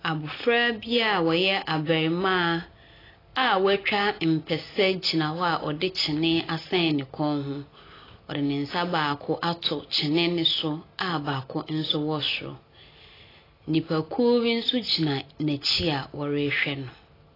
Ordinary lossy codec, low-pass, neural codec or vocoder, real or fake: AAC, 24 kbps; 5.4 kHz; none; real